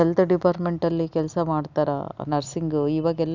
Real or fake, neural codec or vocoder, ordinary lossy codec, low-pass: real; none; none; 7.2 kHz